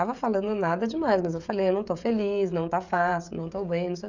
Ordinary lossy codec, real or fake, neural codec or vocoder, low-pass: none; fake; codec, 16 kHz, 16 kbps, FreqCodec, smaller model; 7.2 kHz